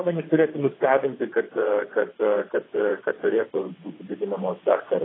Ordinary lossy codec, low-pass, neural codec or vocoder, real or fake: AAC, 16 kbps; 7.2 kHz; vocoder, 22.05 kHz, 80 mel bands, WaveNeXt; fake